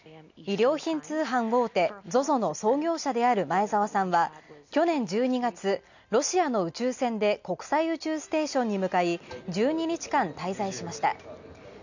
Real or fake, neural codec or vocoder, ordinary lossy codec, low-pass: real; none; none; 7.2 kHz